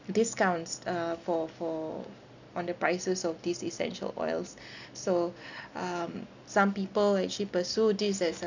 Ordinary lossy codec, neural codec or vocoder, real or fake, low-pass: none; none; real; 7.2 kHz